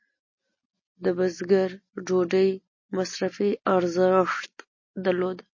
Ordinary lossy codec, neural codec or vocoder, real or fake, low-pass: MP3, 32 kbps; none; real; 7.2 kHz